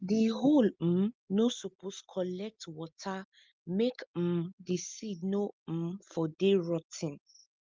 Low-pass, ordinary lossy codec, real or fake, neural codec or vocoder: 7.2 kHz; Opus, 24 kbps; real; none